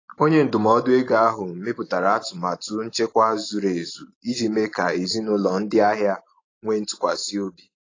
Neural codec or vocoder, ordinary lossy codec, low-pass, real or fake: none; AAC, 32 kbps; 7.2 kHz; real